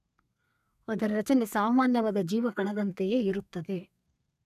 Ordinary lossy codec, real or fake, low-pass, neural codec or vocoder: none; fake; 14.4 kHz; codec, 32 kHz, 1.9 kbps, SNAC